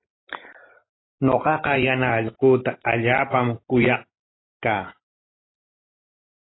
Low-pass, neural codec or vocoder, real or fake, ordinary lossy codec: 7.2 kHz; none; real; AAC, 16 kbps